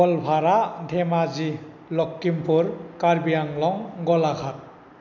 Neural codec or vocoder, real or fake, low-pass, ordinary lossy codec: none; real; 7.2 kHz; none